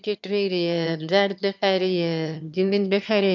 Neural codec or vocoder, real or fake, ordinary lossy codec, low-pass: autoencoder, 22.05 kHz, a latent of 192 numbers a frame, VITS, trained on one speaker; fake; none; 7.2 kHz